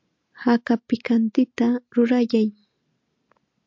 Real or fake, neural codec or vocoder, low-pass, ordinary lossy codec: real; none; 7.2 kHz; MP3, 48 kbps